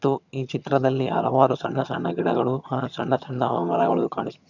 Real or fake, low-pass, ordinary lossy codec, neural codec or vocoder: fake; 7.2 kHz; none; vocoder, 22.05 kHz, 80 mel bands, HiFi-GAN